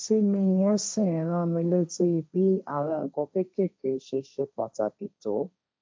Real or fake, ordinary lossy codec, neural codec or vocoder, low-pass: fake; none; codec, 16 kHz, 1.1 kbps, Voila-Tokenizer; none